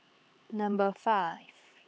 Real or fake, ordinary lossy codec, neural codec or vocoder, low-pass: fake; none; codec, 16 kHz, 4 kbps, X-Codec, HuBERT features, trained on LibriSpeech; none